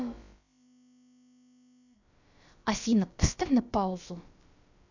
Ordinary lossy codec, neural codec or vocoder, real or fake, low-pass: none; codec, 16 kHz, about 1 kbps, DyCAST, with the encoder's durations; fake; 7.2 kHz